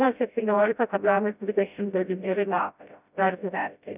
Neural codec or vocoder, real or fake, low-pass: codec, 16 kHz, 0.5 kbps, FreqCodec, smaller model; fake; 3.6 kHz